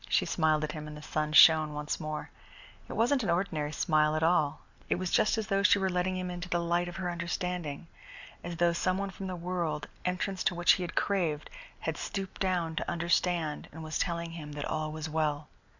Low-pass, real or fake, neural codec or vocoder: 7.2 kHz; real; none